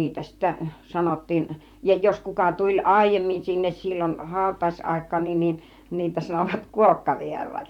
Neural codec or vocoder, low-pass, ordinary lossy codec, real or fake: vocoder, 44.1 kHz, 128 mel bands, Pupu-Vocoder; 19.8 kHz; none; fake